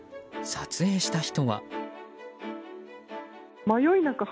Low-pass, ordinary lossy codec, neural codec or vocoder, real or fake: none; none; none; real